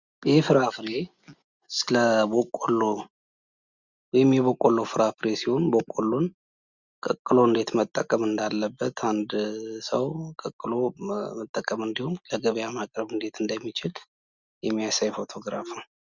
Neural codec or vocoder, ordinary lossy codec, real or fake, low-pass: none; Opus, 64 kbps; real; 7.2 kHz